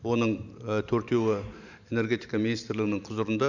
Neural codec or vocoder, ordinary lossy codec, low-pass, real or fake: vocoder, 44.1 kHz, 128 mel bands every 512 samples, BigVGAN v2; none; 7.2 kHz; fake